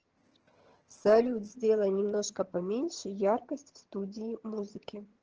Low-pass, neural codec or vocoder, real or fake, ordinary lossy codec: 7.2 kHz; vocoder, 22.05 kHz, 80 mel bands, HiFi-GAN; fake; Opus, 16 kbps